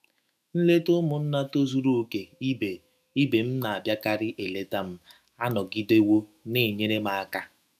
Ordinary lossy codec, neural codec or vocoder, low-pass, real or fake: none; autoencoder, 48 kHz, 128 numbers a frame, DAC-VAE, trained on Japanese speech; 14.4 kHz; fake